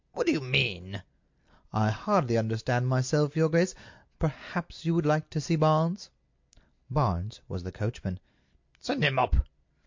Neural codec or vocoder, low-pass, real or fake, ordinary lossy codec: none; 7.2 kHz; real; MP3, 48 kbps